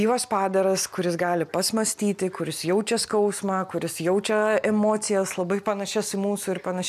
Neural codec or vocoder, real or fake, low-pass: none; real; 14.4 kHz